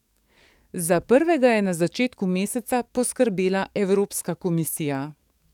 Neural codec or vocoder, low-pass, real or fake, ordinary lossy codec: codec, 44.1 kHz, 7.8 kbps, DAC; 19.8 kHz; fake; none